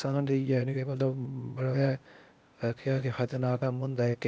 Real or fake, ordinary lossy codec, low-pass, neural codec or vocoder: fake; none; none; codec, 16 kHz, 0.8 kbps, ZipCodec